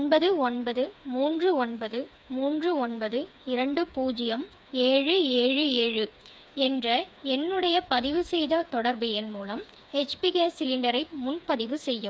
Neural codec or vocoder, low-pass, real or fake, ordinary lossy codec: codec, 16 kHz, 4 kbps, FreqCodec, smaller model; none; fake; none